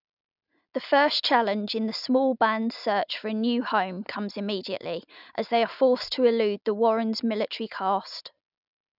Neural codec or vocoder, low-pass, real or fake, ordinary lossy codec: none; 5.4 kHz; real; none